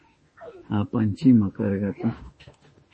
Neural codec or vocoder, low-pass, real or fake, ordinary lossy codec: autoencoder, 48 kHz, 32 numbers a frame, DAC-VAE, trained on Japanese speech; 10.8 kHz; fake; MP3, 32 kbps